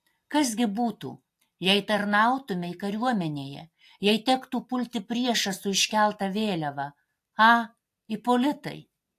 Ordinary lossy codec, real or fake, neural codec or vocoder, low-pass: AAC, 64 kbps; real; none; 14.4 kHz